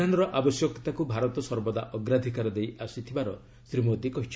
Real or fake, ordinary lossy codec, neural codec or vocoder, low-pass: real; none; none; none